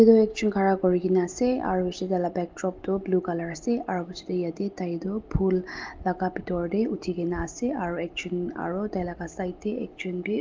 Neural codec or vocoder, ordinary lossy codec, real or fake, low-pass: none; Opus, 32 kbps; real; 7.2 kHz